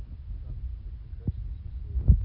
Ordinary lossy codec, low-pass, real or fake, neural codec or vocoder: none; 5.4 kHz; real; none